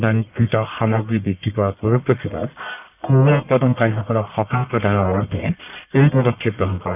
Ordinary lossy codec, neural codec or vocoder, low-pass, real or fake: none; codec, 44.1 kHz, 1.7 kbps, Pupu-Codec; 3.6 kHz; fake